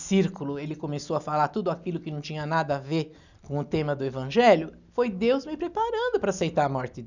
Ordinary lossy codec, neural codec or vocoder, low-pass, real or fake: none; none; 7.2 kHz; real